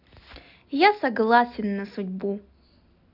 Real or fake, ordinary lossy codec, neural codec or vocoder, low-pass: real; AAC, 48 kbps; none; 5.4 kHz